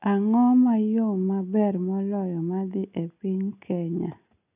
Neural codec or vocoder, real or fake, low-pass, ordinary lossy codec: none; real; 3.6 kHz; none